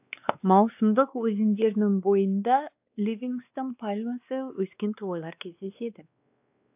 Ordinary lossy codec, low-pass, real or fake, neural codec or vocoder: AAC, 32 kbps; 3.6 kHz; fake; codec, 16 kHz, 2 kbps, X-Codec, WavLM features, trained on Multilingual LibriSpeech